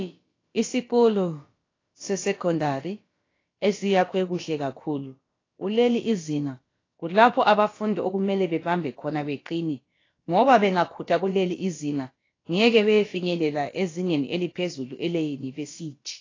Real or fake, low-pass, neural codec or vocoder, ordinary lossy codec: fake; 7.2 kHz; codec, 16 kHz, about 1 kbps, DyCAST, with the encoder's durations; AAC, 32 kbps